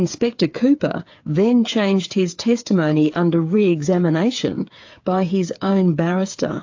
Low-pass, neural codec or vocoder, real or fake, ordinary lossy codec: 7.2 kHz; codec, 16 kHz, 8 kbps, FreqCodec, smaller model; fake; AAC, 48 kbps